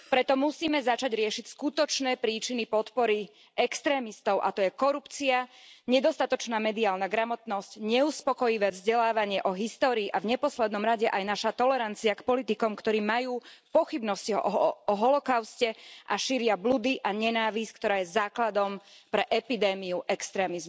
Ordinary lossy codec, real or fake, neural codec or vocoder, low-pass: none; real; none; none